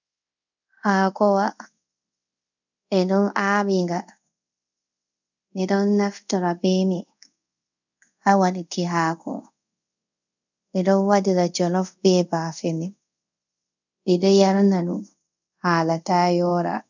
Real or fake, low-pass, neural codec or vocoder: fake; 7.2 kHz; codec, 24 kHz, 0.5 kbps, DualCodec